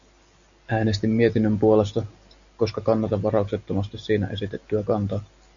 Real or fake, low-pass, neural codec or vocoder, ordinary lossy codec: real; 7.2 kHz; none; MP3, 96 kbps